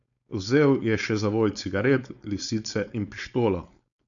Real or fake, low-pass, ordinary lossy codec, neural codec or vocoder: fake; 7.2 kHz; none; codec, 16 kHz, 4.8 kbps, FACodec